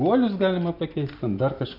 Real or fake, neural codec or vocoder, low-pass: real; none; 5.4 kHz